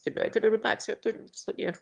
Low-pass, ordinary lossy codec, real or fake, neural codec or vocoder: 9.9 kHz; Opus, 32 kbps; fake; autoencoder, 22.05 kHz, a latent of 192 numbers a frame, VITS, trained on one speaker